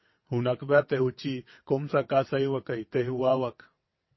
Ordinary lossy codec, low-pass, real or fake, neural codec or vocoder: MP3, 24 kbps; 7.2 kHz; fake; vocoder, 22.05 kHz, 80 mel bands, WaveNeXt